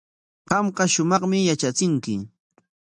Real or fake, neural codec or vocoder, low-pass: real; none; 10.8 kHz